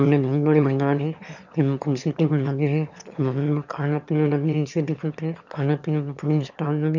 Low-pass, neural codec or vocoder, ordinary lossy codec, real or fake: 7.2 kHz; autoencoder, 22.05 kHz, a latent of 192 numbers a frame, VITS, trained on one speaker; none; fake